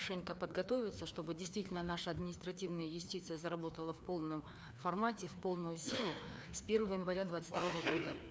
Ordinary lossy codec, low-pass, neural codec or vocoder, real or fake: none; none; codec, 16 kHz, 2 kbps, FreqCodec, larger model; fake